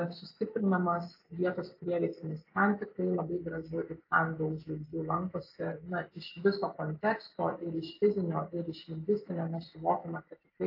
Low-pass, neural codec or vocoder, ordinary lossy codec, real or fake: 5.4 kHz; none; AAC, 32 kbps; real